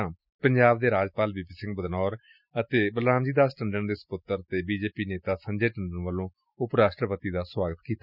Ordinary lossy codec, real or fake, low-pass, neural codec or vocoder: none; real; 5.4 kHz; none